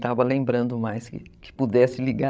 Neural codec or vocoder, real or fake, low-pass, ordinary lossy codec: codec, 16 kHz, 16 kbps, FreqCodec, larger model; fake; none; none